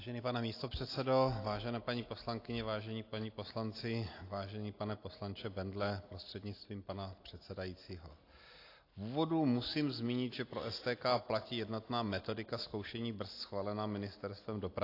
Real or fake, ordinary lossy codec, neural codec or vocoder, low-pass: real; AAC, 32 kbps; none; 5.4 kHz